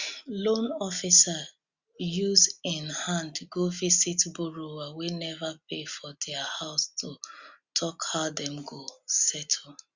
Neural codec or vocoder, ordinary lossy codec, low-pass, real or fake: none; Opus, 64 kbps; 7.2 kHz; real